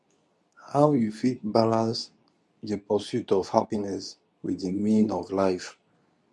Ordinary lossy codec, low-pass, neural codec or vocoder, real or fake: none; none; codec, 24 kHz, 0.9 kbps, WavTokenizer, medium speech release version 2; fake